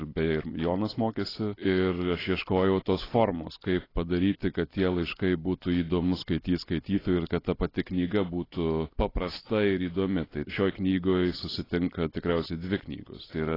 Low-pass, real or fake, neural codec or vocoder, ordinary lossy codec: 5.4 kHz; real; none; AAC, 24 kbps